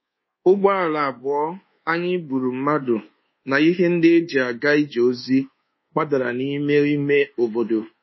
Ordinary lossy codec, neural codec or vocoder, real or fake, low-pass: MP3, 24 kbps; codec, 24 kHz, 1.2 kbps, DualCodec; fake; 7.2 kHz